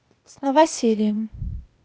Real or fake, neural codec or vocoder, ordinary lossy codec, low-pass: fake; codec, 16 kHz, 0.8 kbps, ZipCodec; none; none